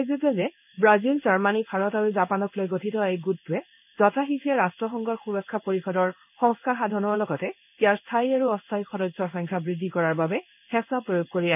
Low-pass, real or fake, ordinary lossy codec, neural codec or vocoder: 3.6 kHz; fake; none; codec, 16 kHz in and 24 kHz out, 1 kbps, XY-Tokenizer